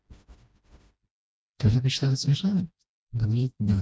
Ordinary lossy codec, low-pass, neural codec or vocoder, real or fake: none; none; codec, 16 kHz, 1 kbps, FreqCodec, smaller model; fake